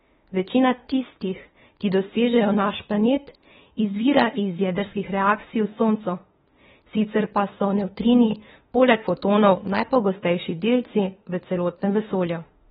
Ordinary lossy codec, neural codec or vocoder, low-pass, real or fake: AAC, 16 kbps; autoencoder, 48 kHz, 32 numbers a frame, DAC-VAE, trained on Japanese speech; 19.8 kHz; fake